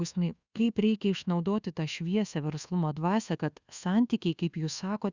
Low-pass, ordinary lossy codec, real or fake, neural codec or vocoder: 7.2 kHz; Opus, 64 kbps; fake; codec, 24 kHz, 1.2 kbps, DualCodec